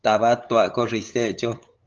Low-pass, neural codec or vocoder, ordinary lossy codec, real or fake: 7.2 kHz; codec, 16 kHz, 8 kbps, FunCodec, trained on LibriTTS, 25 frames a second; Opus, 24 kbps; fake